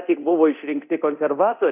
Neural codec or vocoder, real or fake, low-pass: codec, 24 kHz, 0.9 kbps, DualCodec; fake; 3.6 kHz